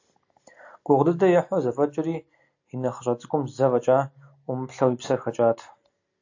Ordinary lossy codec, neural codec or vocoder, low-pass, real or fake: AAC, 48 kbps; none; 7.2 kHz; real